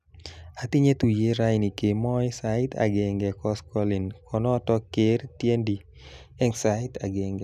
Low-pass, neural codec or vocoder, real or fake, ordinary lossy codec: 14.4 kHz; none; real; none